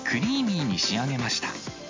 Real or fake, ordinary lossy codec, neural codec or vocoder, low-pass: real; MP3, 48 kbps; none; 7.2 kHz